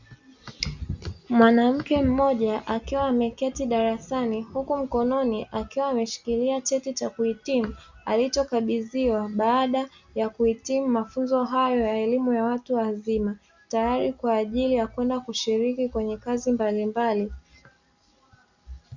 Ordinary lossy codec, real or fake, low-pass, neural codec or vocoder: Opus, 64 kbps; real; 7.2 kHz; none